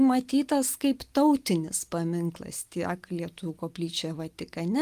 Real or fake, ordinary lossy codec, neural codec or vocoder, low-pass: real; Opus, 32 kbps; none; 14.4 kHz